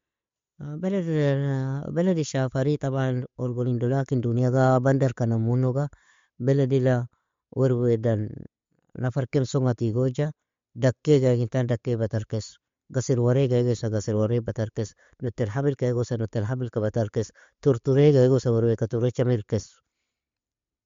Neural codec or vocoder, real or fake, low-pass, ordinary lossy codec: none; real; 7.2 kHz; MP3, 48 kbps